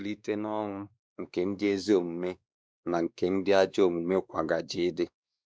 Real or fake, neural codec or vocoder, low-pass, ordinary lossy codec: fake; codec, 16 kHz, 2 kbps, X-Codec, WavLM features, trained on Multilingual LibriSpeech; none; none